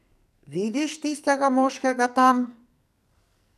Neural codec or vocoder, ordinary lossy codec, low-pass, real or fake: codec, 44.1 kHz, 2.6 kbps, SNAC; none; 14.4 kHz; fake